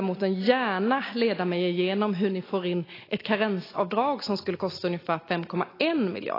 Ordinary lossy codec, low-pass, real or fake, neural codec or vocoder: AAC, 24 kbps; 5.4 kHz; real; none